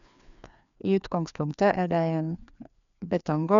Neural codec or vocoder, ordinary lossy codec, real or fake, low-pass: codec, 16 kHz, 2 kbps, FreqCodec, larger model; MP3, 96 kbps; fake; 7.2 kHz